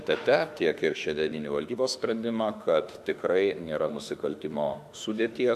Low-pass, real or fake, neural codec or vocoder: 14.4 kHz; fake; autoencoder, 48 kHz, 32 numbers a frame, DAC-VAE, trained on Japanese speech